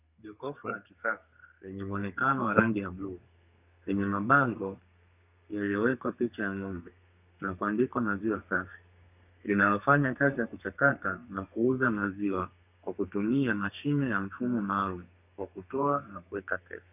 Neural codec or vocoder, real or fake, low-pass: codec, 32 kHz, 1.9 kbps, SNAC; fake; 3.6 kHz